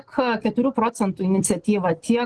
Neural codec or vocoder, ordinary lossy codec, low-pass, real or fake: none; Opus, 16 kbps; 10.8 kHz; real